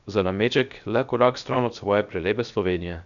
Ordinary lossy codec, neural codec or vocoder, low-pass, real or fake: none; codec, 16 kHz, 0.7 kbps, FocalCodec; 7.2 kHz; fake